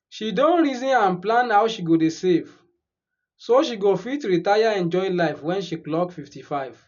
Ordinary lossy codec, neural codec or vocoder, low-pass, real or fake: none; none; 7.2 kHz; real